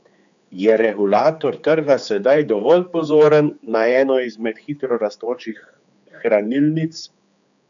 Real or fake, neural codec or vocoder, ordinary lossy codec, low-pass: fake; codec, 16 kHz, 4 kbps, X-Codec, HuBERT features, trained on general audio; none; 7.2 kHz